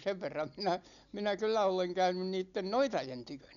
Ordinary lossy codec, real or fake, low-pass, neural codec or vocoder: none; real; 7.2 kHz; none